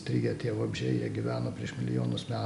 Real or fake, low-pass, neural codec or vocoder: real; 10.8 kHz; none